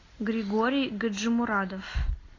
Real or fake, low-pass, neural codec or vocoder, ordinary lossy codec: real; 7.2 kHz; none; AAC, 32 kbps